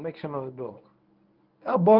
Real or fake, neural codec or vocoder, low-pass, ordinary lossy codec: fake; codec, 24 kHz, 0.9 kbps, WavTokenizer, medium speech release version 1; 5.4 kHz; Opus, 24 kbps